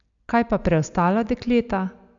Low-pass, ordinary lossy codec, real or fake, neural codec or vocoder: 7.2 kHz; AAC, 64 kbps; real; none